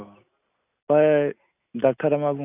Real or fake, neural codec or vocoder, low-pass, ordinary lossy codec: real; none; 3.6 kHz; none